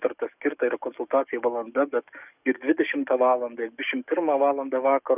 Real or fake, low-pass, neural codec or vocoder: fake; 3.6 kHz; codec, 44.1 kHz, 7.8 kbps, DAC